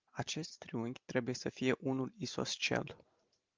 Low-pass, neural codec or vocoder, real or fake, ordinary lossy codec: 7.2 kHz; none; real; Opus, 32 kbps